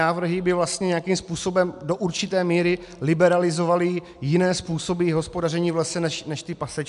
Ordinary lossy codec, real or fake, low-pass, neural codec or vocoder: MP3, 96 kbps; real; 10.8 kHz; none